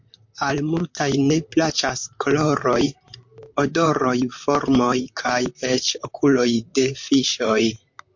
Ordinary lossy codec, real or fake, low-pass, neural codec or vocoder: MP3, 48 kbps; fake; 7.2 kHz; vocoder, 44.1 kHz, 128 mel bands, Pupu-Vocoder